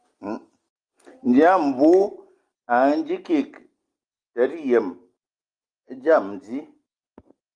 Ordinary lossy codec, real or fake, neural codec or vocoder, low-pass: Opus, 32 kbps; real; none; 9.9 kHz